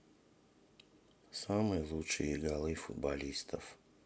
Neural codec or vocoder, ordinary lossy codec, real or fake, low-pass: none; none; real; none